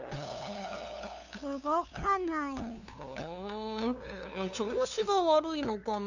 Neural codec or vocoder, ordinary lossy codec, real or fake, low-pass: codec, 16 kHz, 2 kbps, FunCodec, trained on LibriTTS, 25 frames a second; none; fake; 7.2 kHz